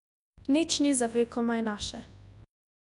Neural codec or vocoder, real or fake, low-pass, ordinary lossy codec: codec, 24 kHz, 0.9 kbps, WavTokenizer, large speech release; fake; 10.8 kHz; none